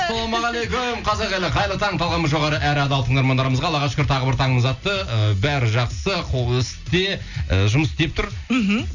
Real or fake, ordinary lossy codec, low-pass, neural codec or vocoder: real; none; 7.2 kHz; none